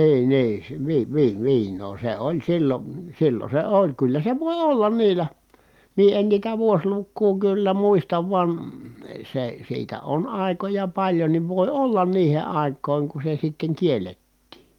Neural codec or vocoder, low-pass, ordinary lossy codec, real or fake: none; 19.8 kHz; Opus, 32 kbps; real